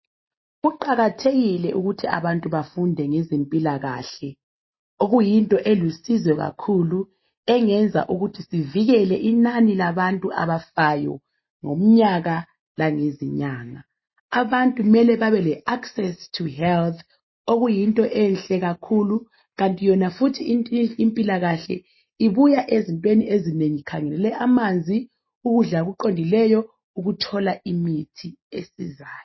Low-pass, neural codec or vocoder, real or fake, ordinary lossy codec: 7.2 kHz; none; real; MP3, 24 kbps